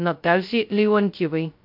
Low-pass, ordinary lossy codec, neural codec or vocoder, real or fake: 5.4 kHz; MP3, 48 kbps; codec, 16 kHz, 0.2 kbps, FocalCodec; fake